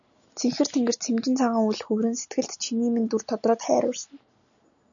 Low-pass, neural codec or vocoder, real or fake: 7.2 kHz; none; real